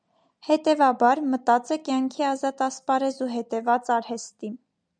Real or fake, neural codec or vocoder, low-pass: real; none; 9.9 kHz